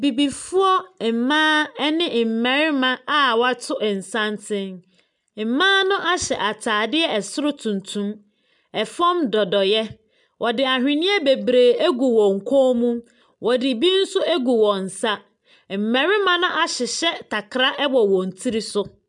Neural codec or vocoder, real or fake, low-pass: none; real; 10.8 kHz